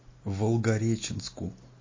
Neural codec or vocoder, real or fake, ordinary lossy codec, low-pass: none; real; MP3, 32 kbps; 7.2 kHz